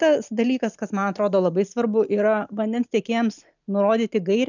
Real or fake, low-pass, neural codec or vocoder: real; 7.2 kHz; none